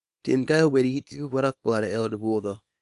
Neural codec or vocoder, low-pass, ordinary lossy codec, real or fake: codec, 24 kHz, 0.9 kbps, WavTokenizer, small release; 10.8 kHz; Opus, 64 kbps; fake